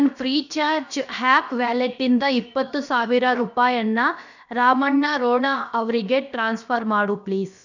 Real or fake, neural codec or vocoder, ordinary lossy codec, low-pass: fake; codec, 16 kHz, about 1 kbps, DyCAST, with the encoder's durations; none; 7.2 kHz